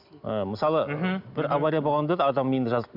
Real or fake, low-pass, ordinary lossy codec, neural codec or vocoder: real; 5.4 kHz; none; none